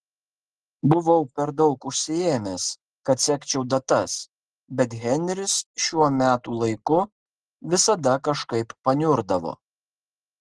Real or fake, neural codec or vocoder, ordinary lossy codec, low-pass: real; none; Opus, 16 kbps; 9.9 kHz